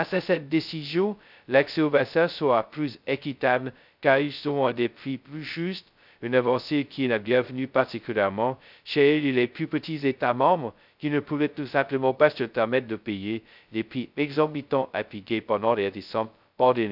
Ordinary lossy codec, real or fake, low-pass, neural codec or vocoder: none; fake; 5.4 kHz; codec, 16 kHz, 0.2 kbps, FocalCodec